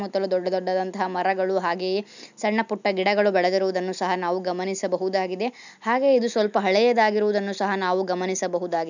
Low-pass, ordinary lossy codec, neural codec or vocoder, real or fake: 7.2 kHz; none; none; real